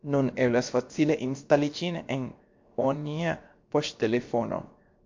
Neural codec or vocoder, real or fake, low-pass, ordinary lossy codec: codec, 16 kHz, 0.7 kbps, FocalCodec; fake; 7.2 kHz; MP3, 48 kbps